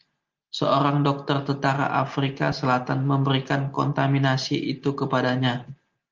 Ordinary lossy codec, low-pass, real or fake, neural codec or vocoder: Opus, 24 kbps; 7.2 kHz; real; none